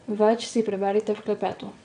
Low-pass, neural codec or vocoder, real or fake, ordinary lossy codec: 9.9 kHz; vocoder, 22.05 kHz, 80 mel bands, WaveNeXt; fake; none